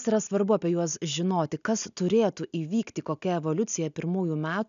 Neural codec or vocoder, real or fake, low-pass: none; real; 7.2 kHz